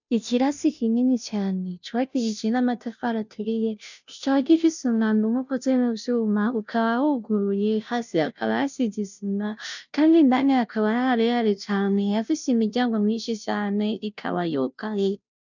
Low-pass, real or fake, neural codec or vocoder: 7.2 kHz; fake; codec, 16 kHz, 0.5 kbps, FunCodec, trained on Chinese and English, 25 frames a second